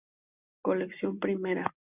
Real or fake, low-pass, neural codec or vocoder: real; 3.6 kHz; none